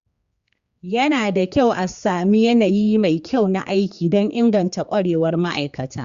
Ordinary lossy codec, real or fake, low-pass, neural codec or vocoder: Opus, 64 kbps; fake; 7.2 kHz; codec, 16 kHz, 4 kbps, X-Codec, HuBERT features, trained on general audio